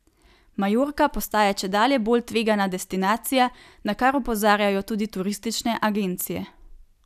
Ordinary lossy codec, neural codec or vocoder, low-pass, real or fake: none; none; 14.4 kHz; real